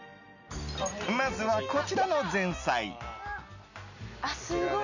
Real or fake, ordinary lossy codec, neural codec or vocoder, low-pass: real; none; none; 7.2 kHz